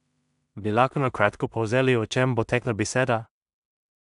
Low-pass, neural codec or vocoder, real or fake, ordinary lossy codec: 10.8 kHz; codec, 16 kHz in and 24 kHz out, 0.4 kbps, LongCat-Audio-Codec, two codebook decoder; fake; none